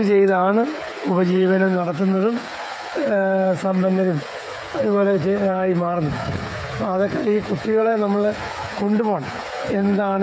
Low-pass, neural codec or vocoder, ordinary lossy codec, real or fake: none; codec, 16 kHz, 8 kbps, FreqCodec, smaller model; none; fake